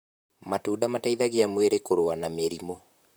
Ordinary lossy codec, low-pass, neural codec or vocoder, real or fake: none; none; vocoder, 44.1 kHz, 128 mel bands, Pupu-Vocoder; fake